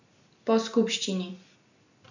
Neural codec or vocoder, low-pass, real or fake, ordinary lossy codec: none; 7.2 kHz; real; none